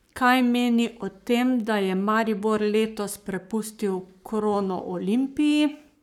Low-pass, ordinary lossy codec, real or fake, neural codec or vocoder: 19.8 kHz; none; fake; codec, 44.1 kHz, 7.8 kbps, Pupu-Codec